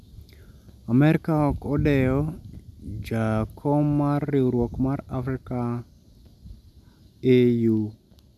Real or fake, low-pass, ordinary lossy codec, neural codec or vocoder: real; 14.4 kHz; MP3, 96 kbps; none